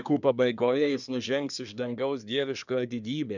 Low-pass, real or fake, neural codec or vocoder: 7.2 kHz; fake; codec, 24 kHz, 1 kbps, SNAC